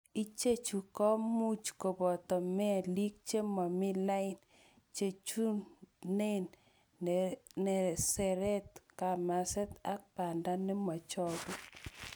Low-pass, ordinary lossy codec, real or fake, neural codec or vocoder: none; none; real; none